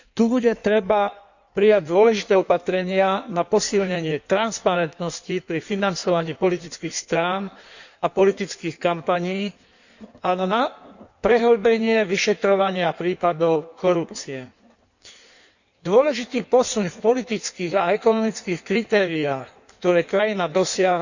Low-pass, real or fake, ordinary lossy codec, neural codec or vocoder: 7.2 kHz; fake; none; codec, 16 kHz in and 24 kHz out, 1.1 kbps, FireRedTTS-2 codec